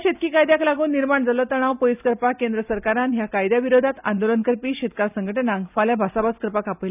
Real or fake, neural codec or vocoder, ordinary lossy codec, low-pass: real; none; none; 3.6 kHz